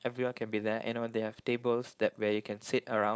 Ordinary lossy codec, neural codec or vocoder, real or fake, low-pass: none; codec, 16 kHz, 4.8 kbps, FACodec; fake; none